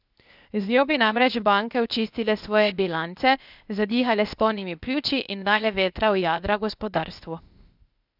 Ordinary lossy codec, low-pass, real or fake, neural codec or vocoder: none; 5.4 kHz; fake; codec, 16 kHz, 0.8 kbps, ZipCodec